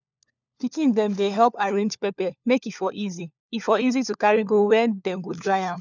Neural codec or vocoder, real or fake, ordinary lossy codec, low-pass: codec, 16 kHz, 4 kbps, FunCodec, trained on LibriTTS, 50 frames a second; fake; none; 7.2 kHz